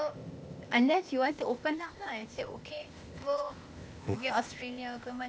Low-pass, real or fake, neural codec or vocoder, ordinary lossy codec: none; fake; codec, 16 kHz, 0.8 kbps, ZipCodec; none